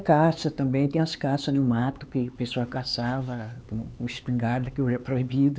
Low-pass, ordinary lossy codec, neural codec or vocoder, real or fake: none; none; codec, 16 kHz, 4 kbps, X-Codec, HuBERT features, trained on LibriSpeech; fake